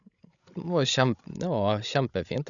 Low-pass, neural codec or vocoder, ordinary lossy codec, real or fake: 7.2 kHz; codec, 16 kHz, 16 kbps, FreqCodec, larger model; none; fake